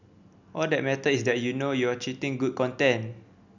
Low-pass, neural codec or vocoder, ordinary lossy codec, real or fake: 7.2 kHz; none; none; real